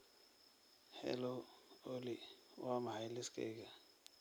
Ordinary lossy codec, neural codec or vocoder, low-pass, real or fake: none; none; none; real